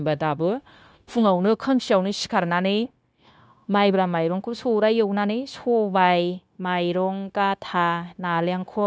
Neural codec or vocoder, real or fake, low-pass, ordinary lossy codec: codec, 16 kHz, 0.9 kbps, LongCat-Audio-Codec; fake; none; none